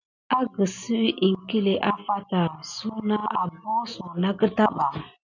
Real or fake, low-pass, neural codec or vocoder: real; 7.2 kHz; none